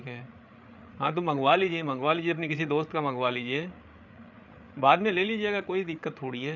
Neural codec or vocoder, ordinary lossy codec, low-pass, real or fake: codec, 16 kHz, 8 kbps, FreqCodec, larger model; none; 7.2 kHz; fake